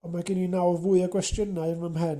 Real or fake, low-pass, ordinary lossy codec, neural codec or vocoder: real; 14.4 kHz; Opus, 64 kbps; none